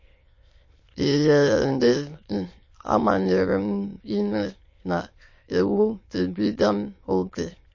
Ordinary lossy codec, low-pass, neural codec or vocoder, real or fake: MP3, 32 kbps; 7.2 kHz; autoencoder, 22.05 kHz, a latent of 192 numbers a frame, VITS, trained on many speakers; fake